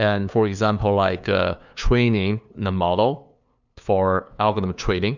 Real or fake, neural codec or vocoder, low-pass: fake; codec, 16 kHz, 2 kbps, FunCodec, trained on LibriTTS, 25 frames a second; 7.2 kHz